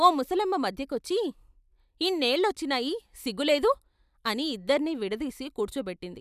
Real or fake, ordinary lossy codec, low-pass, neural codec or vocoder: real; none; 14.4 kHz; none